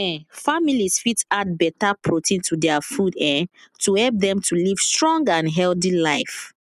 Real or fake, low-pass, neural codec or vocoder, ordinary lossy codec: real; 14.4 kHz; none; none